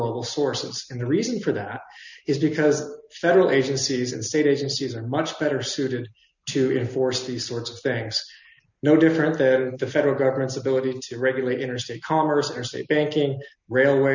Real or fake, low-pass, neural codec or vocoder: real; 7.2 kHz; none